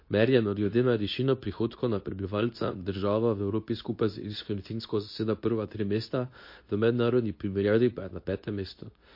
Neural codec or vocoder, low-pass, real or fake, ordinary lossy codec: codec, 24 kHz, 0.9 kbps, WavTokenizer, medium speech release version 2; 5.4 kHz; fake; MP3, 32 kbps